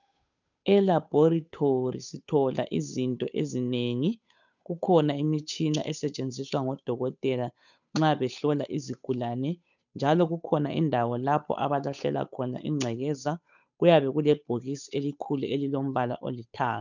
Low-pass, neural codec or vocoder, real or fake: 7.2 kHz; codec, 16 kHz, 8 kbps, FunCodec, trained on Chinese and English, 25 frames a second; fake